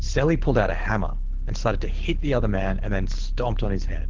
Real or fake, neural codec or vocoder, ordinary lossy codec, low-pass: fake; codec, 24 kHz, 6 kbps, HILCodec; Opus, 16 kbps; 7.2 kHz